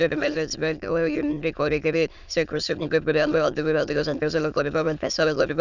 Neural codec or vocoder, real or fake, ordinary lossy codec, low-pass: autoencoder, 22.05 kHz, a latent of 192 numbers a frame, VITS, trained on many speakers; fake; none; 7.2 kHz